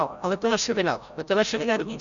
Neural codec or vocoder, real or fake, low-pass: codec, 16 kHz, 0.5 kbps, FreqCodec, larger model; fake; 7.2 kHz